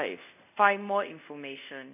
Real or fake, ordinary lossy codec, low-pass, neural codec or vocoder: fake; none; 3.6 kHz; codec, 24 kHz, 0.5 kbps, DualCodec